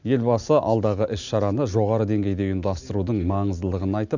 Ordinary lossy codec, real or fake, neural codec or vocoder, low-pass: none; real; none; 7.2 kHz